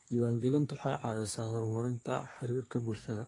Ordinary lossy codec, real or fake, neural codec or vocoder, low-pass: AAC, 32 kbps; fake; codec, 24 kHz, 1 kbps, SNAC; 10.8 kHz